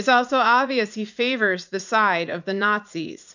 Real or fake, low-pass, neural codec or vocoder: fake; 7.2 kHz; vocoder, 44.1 kHz, 80 mel bands, Vocos